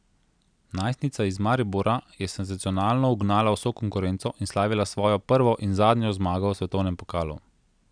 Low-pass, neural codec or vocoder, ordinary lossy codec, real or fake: 9.9 kHz; none; none; real